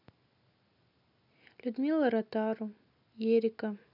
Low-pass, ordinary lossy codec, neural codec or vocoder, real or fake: 5.4 kHz; none; none; real